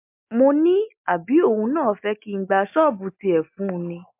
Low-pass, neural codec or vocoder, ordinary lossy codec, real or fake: 3.6 kHz; none; MP3, 32 kbps; real